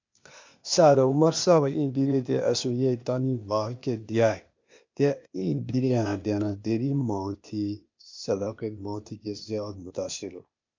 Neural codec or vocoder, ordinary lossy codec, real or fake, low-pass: codec, 16 kHz, 0.8 kbps, ZipCodec; MP3, 64 kbps; fake; 7.2 kHz